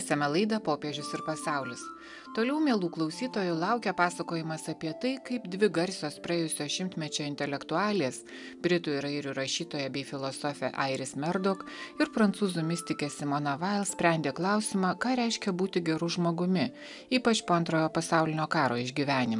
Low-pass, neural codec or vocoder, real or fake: 10.8 kHz; none; real